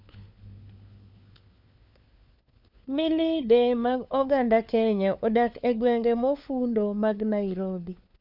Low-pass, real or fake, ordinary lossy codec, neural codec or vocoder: 5.4 kHz; fake; none; codec, 16 kHz, 4 kbps, FunCodec, trained on LibriTTS, 50 frames a second